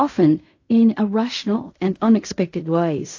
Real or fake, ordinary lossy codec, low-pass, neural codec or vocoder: fake; AAC, 48 kbps; 7.2 kHz; codec, 16 kHz in and 24 kHz out, 0.4 kbps, LongCat-Audio-Codec, fine tuned four codebook decoder